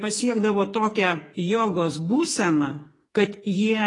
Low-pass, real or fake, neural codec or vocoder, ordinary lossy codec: 10.8 kHz; fake; codec, 44.1 kHz, 2.6 kbps, SNAC; AAC, 32 kbps